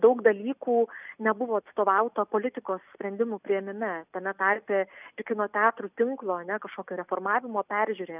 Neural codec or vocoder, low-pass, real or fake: none; 3.6 kHz; real